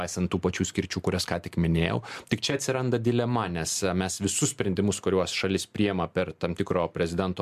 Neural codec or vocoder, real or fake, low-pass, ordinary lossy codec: none; real; 14.4 kHz; MP3, 96 kbps